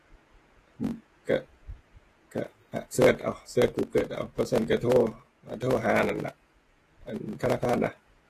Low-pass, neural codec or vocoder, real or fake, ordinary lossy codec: 14.4 kHz; vocoder, 48 kHz, 128 mel bands, Vocos; fake; AAC, 48 kbps